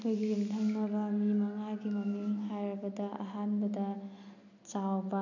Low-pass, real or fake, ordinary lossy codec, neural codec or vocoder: 7.2 kHz; real; none; none